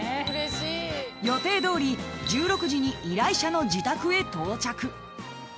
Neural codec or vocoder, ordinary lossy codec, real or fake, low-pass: none; none; real; none